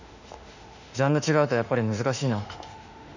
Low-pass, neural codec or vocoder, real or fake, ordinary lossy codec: 7.2 kHz; autoencoder, 48 kHz, 32 numbers a frame, DAC-VAE, trained on Japanese speech; fake; none